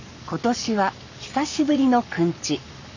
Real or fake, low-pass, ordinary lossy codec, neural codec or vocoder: fake; 7.2 kHz; none; codec, 44.1 kHz, 7.8 kbps, Pupu-Codec